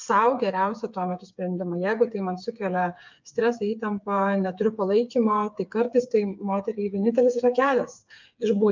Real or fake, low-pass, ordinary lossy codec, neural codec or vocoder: fake; 7.2 kHz; MP3, 64 kbps; codec, 16 kHz, 8 kbps, FreqCodec, smaller model